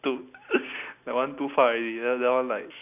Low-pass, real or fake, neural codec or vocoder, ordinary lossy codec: 3.6 kHz; real; none; none